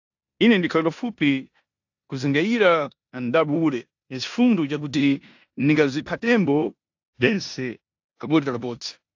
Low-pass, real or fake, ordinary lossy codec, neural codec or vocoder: 7.2 kHz; fake; AAC, 48 kbps; codec, 16 kHz in and 24 kHz out, 0.9 kbps, LongCat-Audio-Codec, four codebook decoder